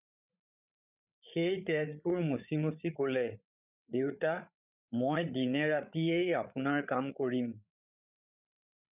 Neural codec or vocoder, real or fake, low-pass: codec, 16 kHz, 8 kbps, FreqCodec, larger model; fake; 3.6 kHz